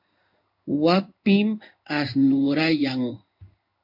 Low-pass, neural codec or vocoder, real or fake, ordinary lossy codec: 5.4 kHz; codec, 16 kHz in and 24 kHz out, 1 kbps, XY-Tokenizer; fake; MP3, 48 kbps